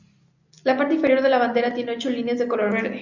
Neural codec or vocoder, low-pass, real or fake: none; 7.2 kHz; real